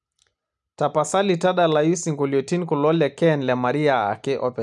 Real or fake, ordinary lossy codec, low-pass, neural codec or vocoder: real; none; none; none